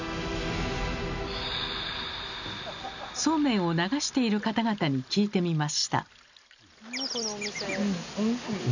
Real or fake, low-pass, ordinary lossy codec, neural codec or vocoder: real; 7.2 kHz; none; none